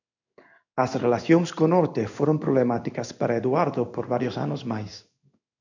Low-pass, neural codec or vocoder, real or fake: 7.2 kHz; codec, 16 kHz in and 24 kHz out, 1 kbps, XY-Tokenizer; fake